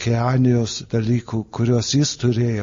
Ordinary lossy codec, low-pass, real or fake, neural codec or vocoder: MP3, 32 kbps; 7.2 kHz; real; none